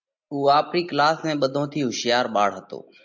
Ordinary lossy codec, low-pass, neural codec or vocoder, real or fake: MP3, 64 kbps; 7.2 kHz; none; real